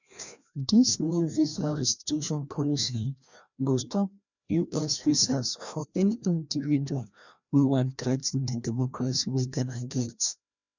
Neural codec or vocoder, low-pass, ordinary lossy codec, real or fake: codec, 16 kHz, 1 kbps, FreqCodec, larger model; 7.2 kHz; none; fake